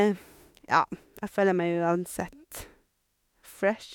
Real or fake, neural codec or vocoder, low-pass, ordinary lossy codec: fake; autoencoder, 48 kHz, 32 numbers a frame, DAC-VAE, trained on Japanese speech; 19.8 kHz; MP3, 96 kbps